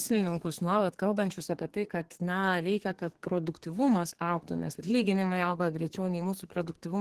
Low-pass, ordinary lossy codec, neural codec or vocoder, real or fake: 14.4 kHz; Opus, 16 kbps; codec, 32 kHz, 1.9 kbps, SNAC; fake